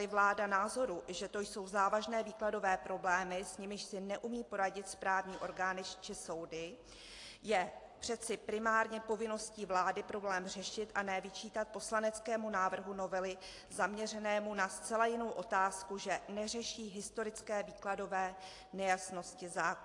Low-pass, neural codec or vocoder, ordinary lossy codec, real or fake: 10.8 kHz; none; AAC, 48 kbps; real